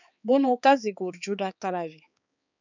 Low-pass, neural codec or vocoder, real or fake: 7.2 kHz; codec, 16 kHz, 4 kbps, X-Codec, HuBERT features, trained on balanced general audio; fake